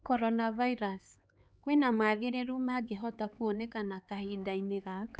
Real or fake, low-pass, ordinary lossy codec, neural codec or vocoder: fake; none; none; codec, 16 kHz, 4 kbps, X-Codec, HuBERT features, trained on LibriSpeech